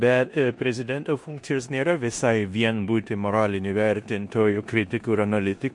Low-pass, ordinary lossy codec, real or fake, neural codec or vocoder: 10.8 kHz; MP3, 48 kbps; fake; codec, 16 kHz in and 24 kHz out, 0.9 kbps, LongCat-Audio-Codec, four codebook decoder